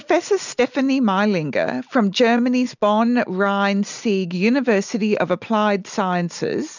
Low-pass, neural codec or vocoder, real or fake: 7.2 kHz; none; real